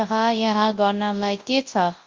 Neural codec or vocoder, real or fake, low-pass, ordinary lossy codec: codec, 24 kHz, 0.9 kbps, WavTokenizer, large speech release; fake; 7.2 kHz; Opus, 32 kbps